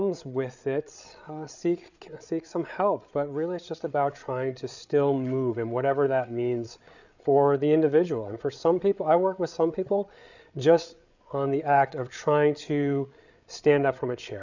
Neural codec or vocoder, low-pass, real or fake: codec, 16 kHz, 8 kbps, FreqCodec, larger model; 7.2 kHz; fake